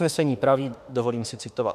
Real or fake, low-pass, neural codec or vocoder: fake; 14.4 kHz; autoencoder, 48 kHz, 32 numbers a frame, DAC-VAE, trained on Japanese speech